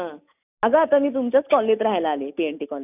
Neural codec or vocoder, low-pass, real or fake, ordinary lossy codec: none; 3.6 kHz; real; none